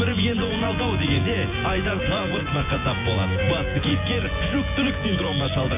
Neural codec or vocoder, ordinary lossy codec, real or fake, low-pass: vocoder, 24 kHz, 100 mel bands, Vocos; MP3, 32 kbps; fake; 3.6 kHz